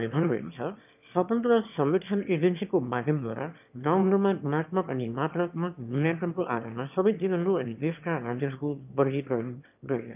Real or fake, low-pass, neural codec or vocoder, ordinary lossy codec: fake; 3.6 kHz; autoencoder, 22.05 kHz, a latent of 192 numbers a frame, VITS, trained on one speaker; none